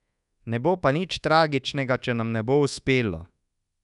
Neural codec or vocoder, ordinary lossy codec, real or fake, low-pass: codec, 24 kHz, 1.2 kbps, DualCodec; none; fake; 10.8 kHz